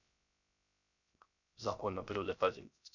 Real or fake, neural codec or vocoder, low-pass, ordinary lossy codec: fake; codec, 16 kHz, 0.3 kbps, FocalCodec; 7.2 kHz; none